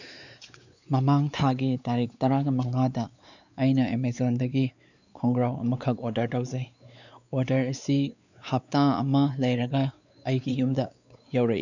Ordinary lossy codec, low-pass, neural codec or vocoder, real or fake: none; 7.2 kHz; codec, 16 kHz, 4 kbps, X-Codec, WavLM features, trained on Multilingual LibriSpeech; fake